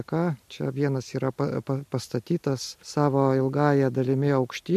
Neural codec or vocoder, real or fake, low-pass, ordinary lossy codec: none; real; 14.4 kHz; MP3, 64 kbps